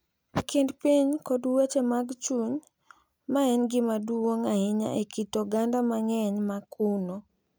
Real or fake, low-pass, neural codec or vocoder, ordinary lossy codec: real; none; none; none